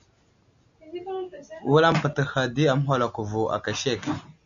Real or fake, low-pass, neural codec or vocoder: real; 7.2 kHz; none